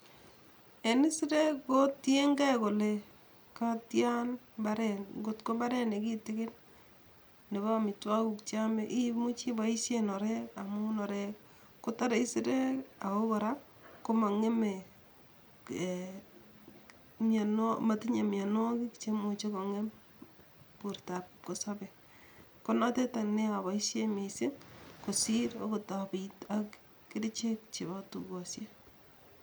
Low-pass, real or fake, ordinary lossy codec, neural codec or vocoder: none; real; none; none